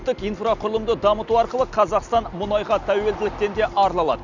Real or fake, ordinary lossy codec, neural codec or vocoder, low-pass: real; none; none; 7.2 kHz